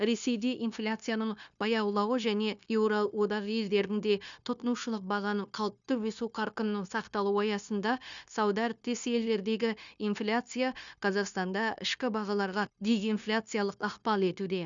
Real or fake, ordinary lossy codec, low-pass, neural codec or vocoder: fake; none; 7.2 kHz; codec, 16 kHz, 0.9 kbps, LongCat-Audio-Codec